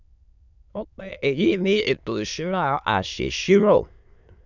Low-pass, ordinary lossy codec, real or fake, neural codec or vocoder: 7.2 kHz; none; fake; autoencoder, 22.05 kHz, a latent of 192 numbers a frame, VITS, trained on many speakers